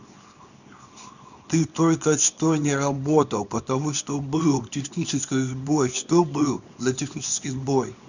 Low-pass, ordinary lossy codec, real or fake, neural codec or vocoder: 7.2 kHz; none; fake; codec, 24 kHz, 0.9 kbps, WavTokenizer, small release